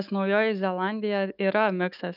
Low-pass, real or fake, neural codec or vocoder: 5.4 kHz; fake; autoencoder, 48 kHz, 128 numbers a frame, DAC-VAE, trained on Japanese speech